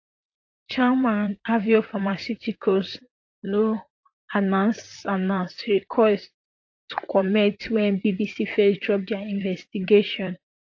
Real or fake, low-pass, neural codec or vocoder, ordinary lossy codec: fake; 7.2 kHz; vocoder, 44.1 kHz, 128 mel bands, Pupu-Vocoder; AAC, 48 kbps